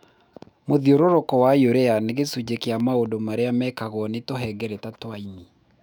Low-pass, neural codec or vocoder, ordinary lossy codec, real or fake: 19.8 kHz; autoencoder, 48 kHz, 128 numbers a frame, DAC-VAE, trained on Japanese speech; none; fake